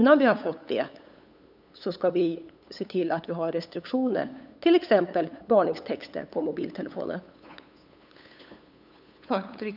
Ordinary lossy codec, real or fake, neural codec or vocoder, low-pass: none; fake; codec, 16 kHz, 8 kbps, FunCodec, trained on LibriTTS, 25 frames a second; 5.4 kHz